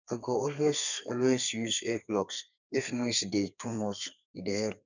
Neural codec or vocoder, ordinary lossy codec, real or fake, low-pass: codec, 32 kHz, 1.9 kbps, SNAC; none; fake; 7.2 kHz